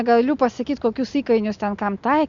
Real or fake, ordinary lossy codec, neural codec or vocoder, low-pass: real; MP3, 64 kbps; none; 7.2 kHz